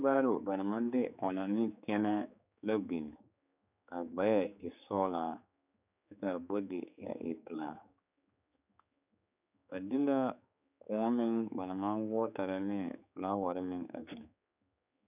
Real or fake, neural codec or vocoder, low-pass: fake; codec, 16 kHz, 4 kbps, X-Codec, HuBERT features, trained on general audio; 3.6 kHz